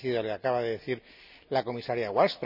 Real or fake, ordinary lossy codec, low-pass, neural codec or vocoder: real; none; 5.4 kHz; none